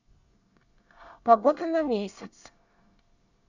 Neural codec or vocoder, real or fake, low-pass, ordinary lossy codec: codec, 24 kHz, 1 kbps, SNAC; fake; 7.2 kHz; none